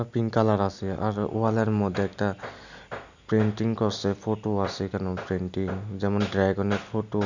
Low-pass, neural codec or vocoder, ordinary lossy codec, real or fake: 7.2 kHz; none; none; real